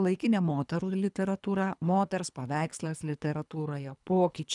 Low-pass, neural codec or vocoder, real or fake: 10.8 kHz; codec, 24 kHz, 3 kbps, HILCodec; fake